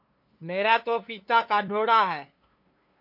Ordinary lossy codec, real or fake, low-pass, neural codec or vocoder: MP3, 32 kbps; fake; 5.4 kHz; codec, 16 kHz, 2 kbps, FunCodec, trained on LibriTTS, 25 frames a second